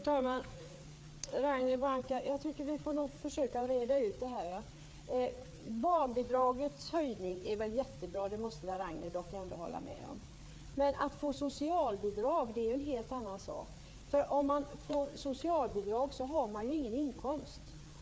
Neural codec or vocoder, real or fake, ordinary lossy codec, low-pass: codec, 16 kHz, 4 kbps, FreqCodec, larger model; fake; none; none